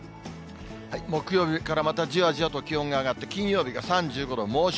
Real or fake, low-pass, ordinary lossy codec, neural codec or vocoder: real; none; none; none